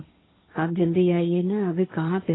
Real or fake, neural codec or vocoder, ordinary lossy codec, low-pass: fake; codec, 16 kHz, 1.1 kbps, Voila-Tokenizer; AAC, 16 kbps; 7.2 kHz